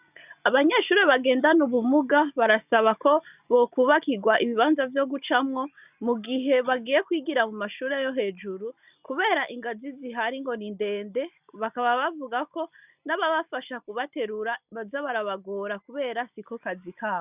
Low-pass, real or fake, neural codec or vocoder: 3.6 kHz; real; none